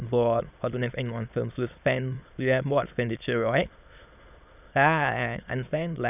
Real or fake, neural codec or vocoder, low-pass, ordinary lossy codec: fake; autoencoder, 22.05 kHz, a latent of 192 numbers a frame, VITS, trained on many speakers; 3.6 kHz; none